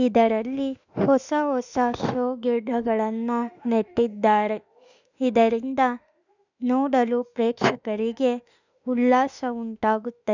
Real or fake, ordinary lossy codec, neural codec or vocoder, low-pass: fake; none; autoencoder, 48 kHz, 32 numbers a frame, DAC-VAE, trained on Japanese speech; 7.2 kHz